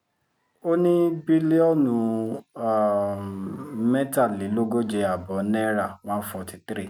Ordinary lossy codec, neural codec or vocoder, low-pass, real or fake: none; none; 19.8 kHz; real